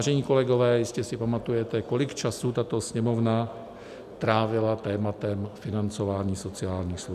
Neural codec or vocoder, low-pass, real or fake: none; 14.4 kHz; real